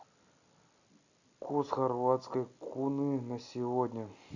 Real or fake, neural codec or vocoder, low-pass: real; none; 7.2 kHz